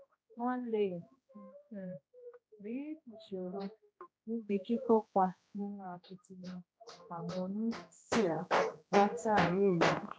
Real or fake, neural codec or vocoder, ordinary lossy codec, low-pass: fake; codec, 16 kHz, 1 kbps, X-Codec, HuBERT features, trained on general audio; none; none